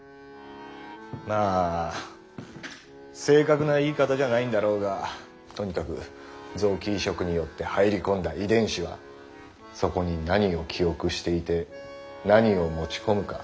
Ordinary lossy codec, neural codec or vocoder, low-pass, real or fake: none; none; none; real